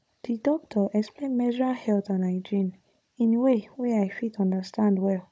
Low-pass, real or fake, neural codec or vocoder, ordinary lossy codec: none; fake; codec, 16 kHz, 16 kbps, FunCodec, trained on Chinese and English, 50 frames a second; none